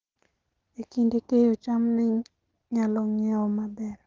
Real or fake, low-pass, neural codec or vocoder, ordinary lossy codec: real; 7.2 kHz; none; Opus, 16 kbps